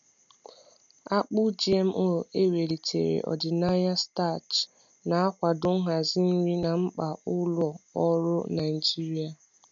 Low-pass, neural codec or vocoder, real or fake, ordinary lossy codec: 7.2 kHz; none; real; none